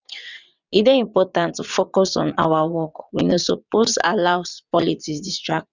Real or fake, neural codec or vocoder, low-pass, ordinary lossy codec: fake; vocoder, 22.05 kHz, 80 mel bands, WaveNeXt; 7.2 kHz; none